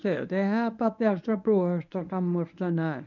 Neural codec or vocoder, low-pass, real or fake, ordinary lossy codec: codec, 24 kHz, 0.9 kbps, WavTokenizer, medium speech release version 1; 7.2 kHz; fake; none